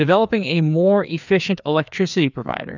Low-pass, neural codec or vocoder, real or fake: 7.2 kHz; codec, 16 kHz, 2 kbps, FreqCodec, larger model; fake